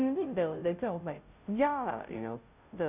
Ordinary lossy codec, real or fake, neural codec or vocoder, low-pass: none; fake; codec, 16 kHz, 0.5 kbps, FunCodec, trained on Chinese and English, 25 frames a second; 3.6 kHz